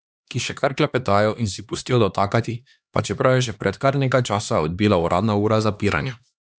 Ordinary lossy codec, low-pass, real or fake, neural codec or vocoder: none; none; fake; codec, 16 kHz, 2 kbps, X-Codec, HuBERT features, trained on LibriSpeech